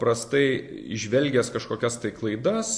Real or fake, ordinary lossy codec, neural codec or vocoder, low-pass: real; MP3, 64 kbps; none; 9.9 kHz